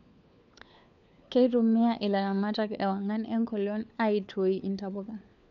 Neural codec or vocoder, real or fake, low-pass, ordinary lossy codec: codec, 16 kHz, 4 kbps, FunCodec, trained on LibriTTS, 50 frames a second; fake; 7.2 kHz; none